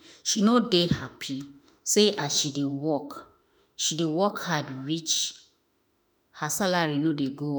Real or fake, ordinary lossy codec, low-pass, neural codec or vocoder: fake; none; none; autoencoder, 48 kHz, 32 numbers a frame, DAC-VAE, trained on Japanese speech